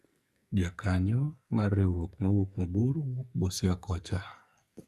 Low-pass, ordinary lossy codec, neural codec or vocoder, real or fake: 14.4 kHz; Opus, 64 kbps; codec, 44.1 kHz, 2.6 kbps, SNAC; fake